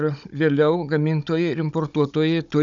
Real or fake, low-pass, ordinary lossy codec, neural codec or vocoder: fake; 7.2 kHz; MP3, 96 kbps; codec, 16 kHz, 16 kbps, FunCodec, trained on Chinese and English, 50 frames a second